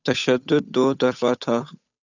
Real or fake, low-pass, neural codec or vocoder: fake; 7.2 kHz; codec, 16 kHz, 8 kbps, FunCodec, trained on Chinese and English, 25 frames a second